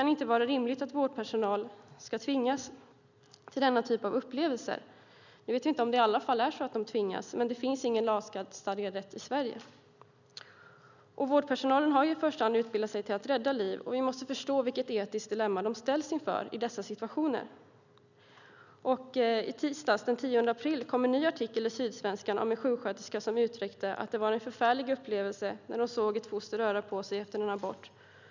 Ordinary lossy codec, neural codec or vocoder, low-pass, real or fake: none; none; 7.2 kHz; real